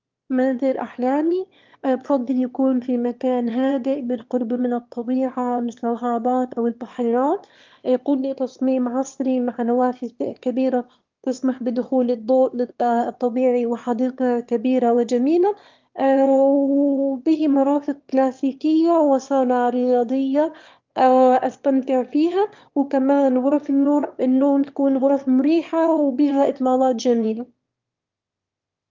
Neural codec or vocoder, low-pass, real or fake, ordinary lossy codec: autoencoder, 22.05 kHz, a latent of 192 numbers a frame, VITS, trained on one speaker; 7.2 kHz; fake; Opus, 32 kbps